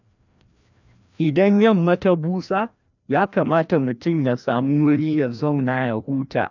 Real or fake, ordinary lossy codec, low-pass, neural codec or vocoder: fake; none; 7.2 kHz; codec, 16 kHz, 1 kbps, FreqCodec, larger model